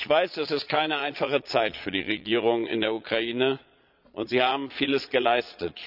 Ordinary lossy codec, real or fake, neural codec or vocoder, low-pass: none; fake; vocoder, 22.05 kHz, 80 mel bands, Vocos; 5.4 kHz